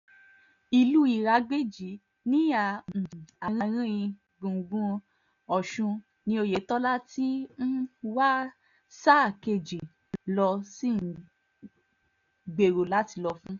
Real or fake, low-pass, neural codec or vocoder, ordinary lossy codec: real; 7.2 kHz; none; none